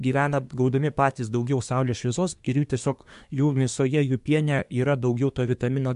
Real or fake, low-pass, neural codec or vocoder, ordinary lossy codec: fake; 10.8 kHz; codec, 24 kHz, 1 kbps, SNAC; MP3, 64 kbps